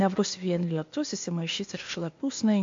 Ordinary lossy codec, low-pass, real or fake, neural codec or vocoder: MP3, 48 kbps; 7.2 kHz; fake; codec, 16 kHz, 0.8 kbps, ZipCodec